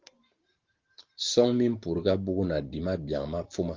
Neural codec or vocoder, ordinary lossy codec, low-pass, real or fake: none; Opus, 16 kbps; 7.2 kHz; real